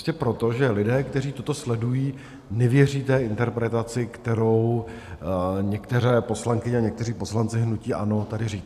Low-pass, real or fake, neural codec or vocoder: 14.4 kHz; real; none